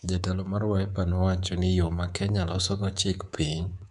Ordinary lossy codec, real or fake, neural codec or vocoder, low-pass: none; fake; codec, 24 kHz, 3.1 kbps, DualCodec; 10.8 kHz